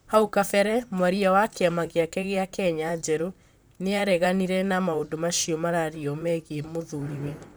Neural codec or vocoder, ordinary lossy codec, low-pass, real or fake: vocoder, 44.1 kHz, 128 mel bands, Pupu-Vocoder; none; none; fake